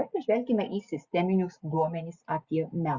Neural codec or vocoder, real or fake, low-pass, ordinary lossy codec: none; real; 7.2 kHz; Opus, 64 kbps